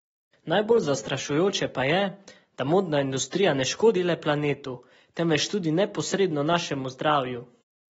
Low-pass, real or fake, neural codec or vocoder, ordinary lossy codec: 19.8 kHz; real; none; AAC, 24 kbps